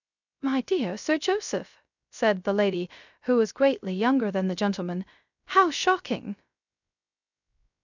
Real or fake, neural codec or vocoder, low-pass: fake; codec, 16 kHz, 0.3 kbps, FocalCodec; 7.2 kHz